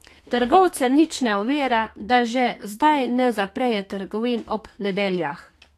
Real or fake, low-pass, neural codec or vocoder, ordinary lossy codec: fake; 14.4 kHz; codec, 32 kHz, 1.9 kbps, SNAC; AAC, 64 kbps